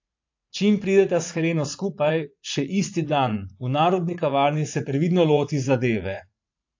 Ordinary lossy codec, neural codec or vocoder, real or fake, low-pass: AAC, 48 kbps; vocoder, 44.1 kHz, 80 mel bands, Vocos; fake; 7.2 kHz